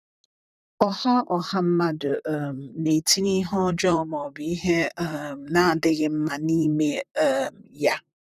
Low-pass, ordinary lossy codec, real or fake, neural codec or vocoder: 14.4 kHz; none; fake; vocoder, 44.1 kHz, 128 mel bands, Pupu-Vocoder